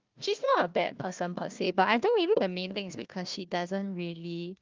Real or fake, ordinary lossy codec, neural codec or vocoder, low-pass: fake; Opus, 24 kbps; codec, 16 kHz, 1 kbps, FunCodec, trained on Chinese and English, 50 frames a second; 7.2 kHz